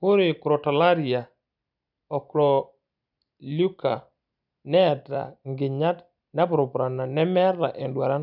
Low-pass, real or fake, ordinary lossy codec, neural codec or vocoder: 5.4 kHz; real; none; none